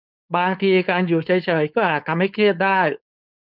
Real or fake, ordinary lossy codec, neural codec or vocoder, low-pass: fake; none; codec, 16 kHz, 4.8 kbps, FACodec; 5.4 kHz